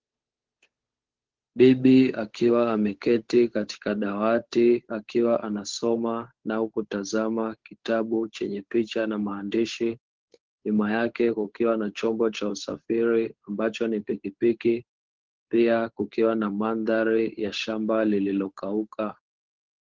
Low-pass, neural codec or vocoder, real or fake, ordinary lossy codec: 7.2 kHz; codec, 16 kHz, 8 kbps, FunCodec, trained on Chinese and English, 25 frames a second; fake; Opus, 16 kbps